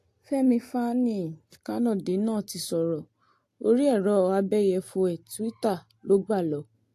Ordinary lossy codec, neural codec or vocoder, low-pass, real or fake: AAC, 64 kbps; none; 14.4 kHz; real